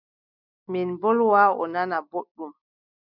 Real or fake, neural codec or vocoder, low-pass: real; none; 5.4 kHz